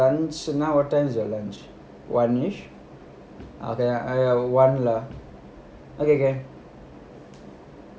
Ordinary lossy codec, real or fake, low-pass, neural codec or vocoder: none; real; none; none